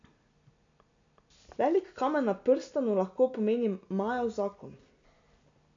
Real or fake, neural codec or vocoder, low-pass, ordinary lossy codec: real; none; 7.2 kHz; MP3, 64 kbps